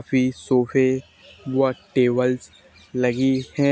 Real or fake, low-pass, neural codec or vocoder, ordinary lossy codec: real; none; none; none